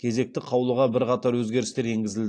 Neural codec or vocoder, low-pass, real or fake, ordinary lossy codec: none; 9.9 kHz; real; AAC, 48 kbps